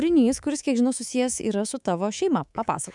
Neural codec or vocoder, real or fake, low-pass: codec, 24 kHz, 3.1 kbps, DualCodec; fake; 10.8 kHz